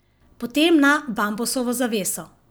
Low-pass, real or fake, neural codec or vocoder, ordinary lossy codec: none; real; none; none